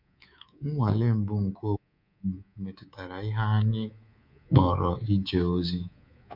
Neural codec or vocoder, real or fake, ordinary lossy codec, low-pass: codec, 24 kHz, 3.1 kbps, DualCodec; fake; MP3, 48 kbps; 5.4 kHz